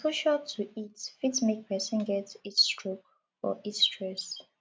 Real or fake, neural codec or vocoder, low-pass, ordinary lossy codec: real; none; none; none